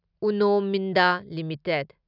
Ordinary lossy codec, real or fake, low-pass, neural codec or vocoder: none; real; 5.4 kHz; none